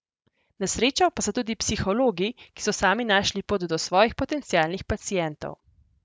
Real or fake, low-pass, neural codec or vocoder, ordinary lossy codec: real; none; none; none